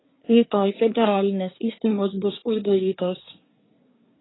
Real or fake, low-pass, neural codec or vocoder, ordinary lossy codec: fake; 7.2 kHz; codec, 24 kHz, 1 kbps, SNAC; AAC, 16 kbps